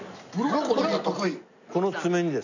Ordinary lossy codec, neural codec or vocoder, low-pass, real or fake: none; none; 7.2 kHz; real